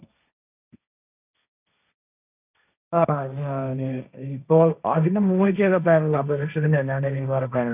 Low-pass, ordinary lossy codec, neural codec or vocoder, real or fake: 3.6 kHz; none; codec, 16 kHz, 1.1 kbps, Voila-Tokenizer; fake